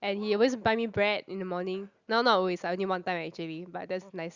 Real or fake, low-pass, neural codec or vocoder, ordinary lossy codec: real; 7.2 kHz; none; Opus, 64 kbps